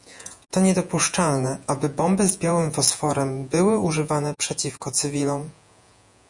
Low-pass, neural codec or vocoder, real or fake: 10.8 kHz; vocoder, 48 kHz, 128 mel bands, Vocos; fake